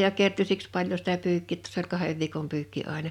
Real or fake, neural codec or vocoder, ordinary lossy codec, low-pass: real; none; none; 19.8 kHz